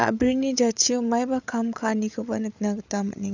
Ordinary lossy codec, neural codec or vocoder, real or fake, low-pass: none; codec, 16 kHz, 8 kbps, FreqCodec, larger model; fake; 7.2 kHz